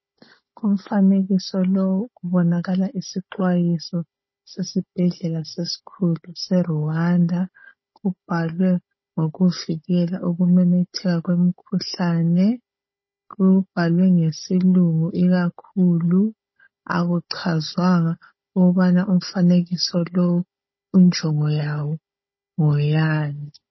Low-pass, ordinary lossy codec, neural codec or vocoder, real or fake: 7.2 kHz; MP3, 24 kbps; codec, 16 kHz, 16 kbps, FunCodec, trained on Chinese and English, 50 frames a second; fake